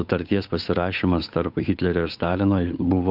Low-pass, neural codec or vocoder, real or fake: 5.4 kHz; codec, 16 kHz, 8 kbps, FunCodec, trained on Chinese and English, 25 frames a second; fake